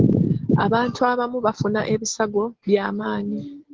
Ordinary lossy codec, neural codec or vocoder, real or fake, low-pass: Opus, 16 kbps; none; real; 7.2 kHz